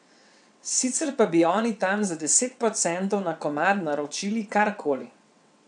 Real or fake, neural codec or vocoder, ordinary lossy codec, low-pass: fake; vocoder, 22.05 kHz, 80 mel bands, WaveNeXt; none; 9.9 kHz